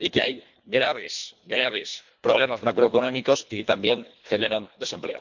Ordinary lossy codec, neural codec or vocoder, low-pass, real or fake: MP3, 64 kbps; codec, 24 kHz, 1.5 kbps, HILCodec; 7.2 kHz; fake